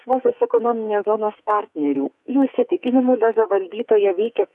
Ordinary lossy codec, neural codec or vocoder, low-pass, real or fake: MP3, 96 kbps; codec, 44.1 kHz, 2.6 kbps, SNAC; 10.8 kHz; fake